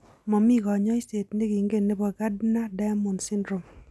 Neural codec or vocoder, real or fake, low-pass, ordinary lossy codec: none; real; none; none